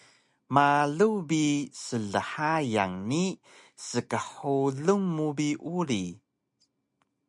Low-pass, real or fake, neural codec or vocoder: 10.8 kHz; real; none